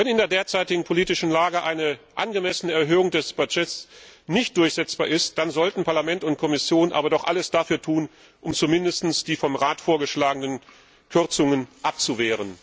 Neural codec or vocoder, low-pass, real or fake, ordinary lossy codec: none; none; real; none